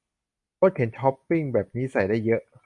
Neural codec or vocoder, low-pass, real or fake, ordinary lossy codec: none; 10.8 kHz; real; AAC, 64 kbps